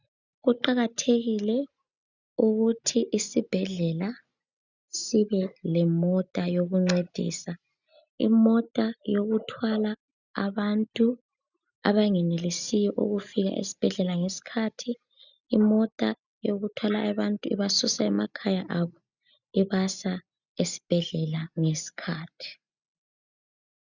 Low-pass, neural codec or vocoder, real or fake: 7.2 kHz; none; real